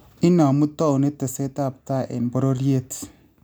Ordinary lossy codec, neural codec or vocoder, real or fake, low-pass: none; none; real; none